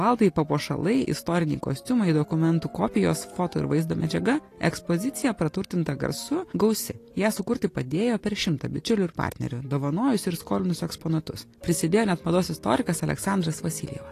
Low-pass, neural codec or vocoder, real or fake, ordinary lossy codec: 14.4 kHz; none; real; AAC, 48 kbps